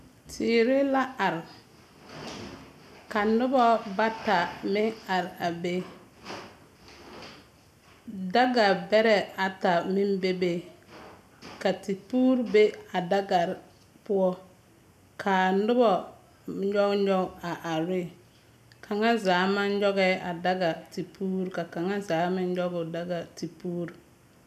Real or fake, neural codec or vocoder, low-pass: real; none; 14.4 kHz